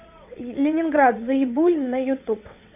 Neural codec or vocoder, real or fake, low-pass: vocoder, 22.05 kHz, 80 mel bands, WaveNeXt; fake; 3.6 kHz